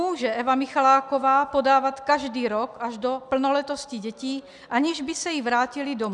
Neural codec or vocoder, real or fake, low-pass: none; real; 10.8 kHz